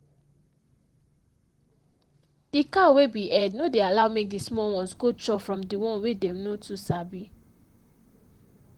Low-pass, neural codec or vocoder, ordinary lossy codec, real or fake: 19.8 kHz; vocoder, 44.1 kHz, 128 mel bands, Pupu-Vocoder; Opus, 24 kbps; fake